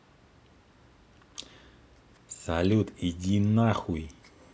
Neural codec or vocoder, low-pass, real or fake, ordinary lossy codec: none; none; real; none